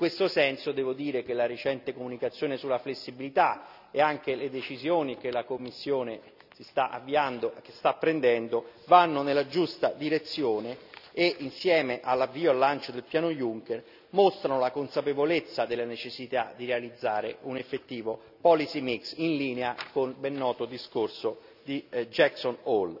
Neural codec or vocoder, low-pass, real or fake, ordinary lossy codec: none; 5.4 kHz; real; none